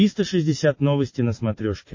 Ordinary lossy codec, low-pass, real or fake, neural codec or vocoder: MP3, 32 kbps; 7.2 kHz; real; none